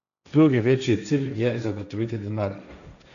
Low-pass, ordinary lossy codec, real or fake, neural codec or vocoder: 7.2 kHz; none; fake; codec, 16 kHz, 1.1 kbps, Voila-Tokenizer